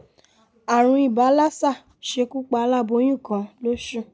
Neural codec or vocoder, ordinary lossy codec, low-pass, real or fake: none; none; none; real